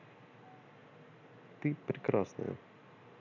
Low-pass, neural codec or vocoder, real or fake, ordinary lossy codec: 7.2 kHz; none; real; none